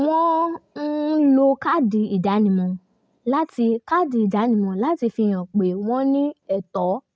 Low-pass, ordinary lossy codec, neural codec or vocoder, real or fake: none; none; none; real